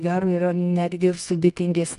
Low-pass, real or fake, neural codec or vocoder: 10.8 kHz; fake; codec, 24 kHz, 0.9 kbps, WavTokenizer, medium music audio release